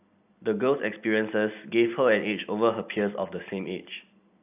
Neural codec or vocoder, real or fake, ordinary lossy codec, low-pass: vocoder, 44.1 kHz, 128 mel bands every 256 samples, BigVGAN v2; fake; AAC, 32 kbps; 3.6 kHz